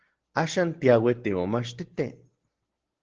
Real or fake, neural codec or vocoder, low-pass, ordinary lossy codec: real; none; 7.2 kHz; Opus, 16 kbps